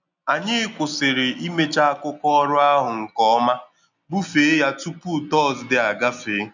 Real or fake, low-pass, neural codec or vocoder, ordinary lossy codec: real; 7.2 kHz; none; none